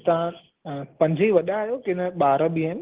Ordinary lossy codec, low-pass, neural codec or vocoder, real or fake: Opus, 16 kbps; 3.6 kHz; none; real